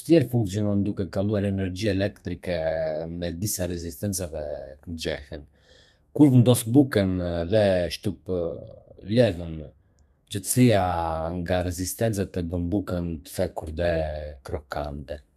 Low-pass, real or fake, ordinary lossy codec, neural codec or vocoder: 14.4 kHz; fake; none; codec, 32 kHz, 1.9 kbps, SNAC